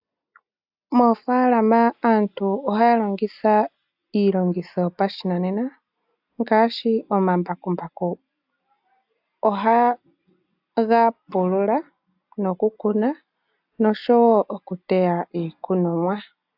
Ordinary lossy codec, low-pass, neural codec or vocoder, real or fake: AAC, 48 kbps; 5.4 kHz; none; real